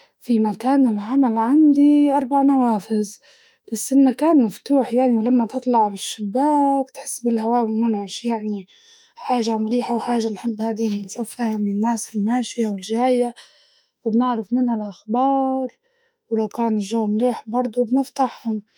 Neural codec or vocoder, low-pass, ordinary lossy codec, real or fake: autoencoder, 48 kHz, 32 numbers a frame, DAC-VAE, trained on Japanese speech; 19.8 kHz; none; fake